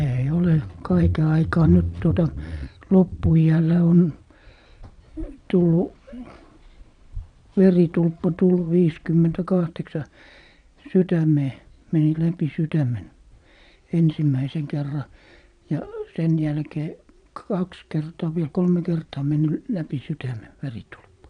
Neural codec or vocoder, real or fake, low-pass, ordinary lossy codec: vocoder, 22.05 kHz, 80 mel bands, Vocos; fake; 9.9 kHz; none